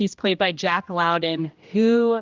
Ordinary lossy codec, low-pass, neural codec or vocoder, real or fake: Opus, 32 kbps; 7.2 kHz; codec, 16 kHz, 1 kbps, X-Codec, HuBERT features, trained on general audio; fake